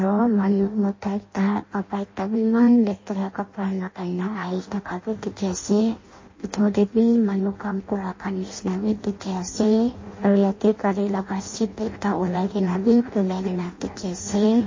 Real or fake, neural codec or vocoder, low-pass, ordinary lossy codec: fake; codec, 16 kHz in and 24 kHz out, 0.6 kbps, FireRedTTS-2 codec; 7.2 kHz; MP3, 32 kbps